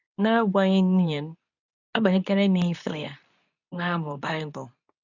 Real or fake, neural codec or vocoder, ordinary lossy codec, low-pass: fake; codec, 24 kHz, 0.9 kbps, WavTokenizer, medium speech release version 2; none; 7.2 kHz